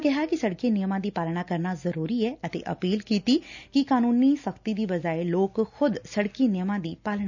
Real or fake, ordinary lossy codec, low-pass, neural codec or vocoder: real; none; 7.2 kHz; none